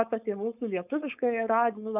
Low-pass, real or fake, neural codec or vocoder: 3.6 kHz; fake; codec, 16 kHz, 4.8 kbps, FACodec